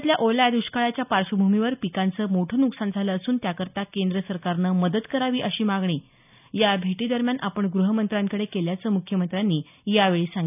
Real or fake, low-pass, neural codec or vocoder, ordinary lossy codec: real; 3.6 kHz; none; none